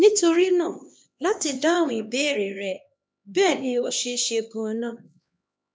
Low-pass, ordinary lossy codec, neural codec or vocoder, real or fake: none; none; codec, 16 kHz, 2 kbps, X-Codec, HuBERT features, trained on LibriSpeech; fake